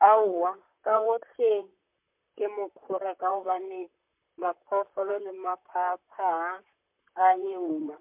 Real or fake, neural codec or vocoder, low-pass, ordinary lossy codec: fake; vocoder, 44.1 kHz, 128 mel bands, Pupu-Vocoder; 3.6 kHz; MP3, 32 kbps